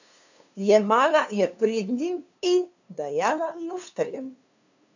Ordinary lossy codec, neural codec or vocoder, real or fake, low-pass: none; codec, 16 kHz, 2 kbps, FunCodec, trained on LibriTTS, 25 frames a second; fake; 7.2 kHz